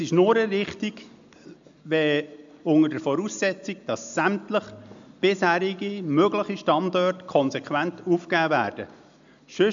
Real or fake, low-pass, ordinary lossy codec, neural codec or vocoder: real; 7.2 kHz; MP3, 64 kbps; none